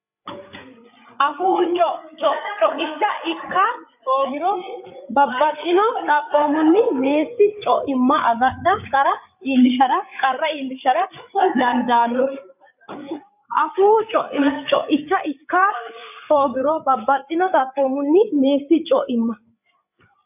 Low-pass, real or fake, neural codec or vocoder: 3.6 kHz; fake; codec, 16 kHz, 8 kbps, FreqCodec, larger model